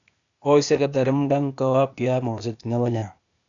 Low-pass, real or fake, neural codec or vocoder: 7.2 kHz; fake; codec, 16 kHz, 0.8 kbps, ZipCodec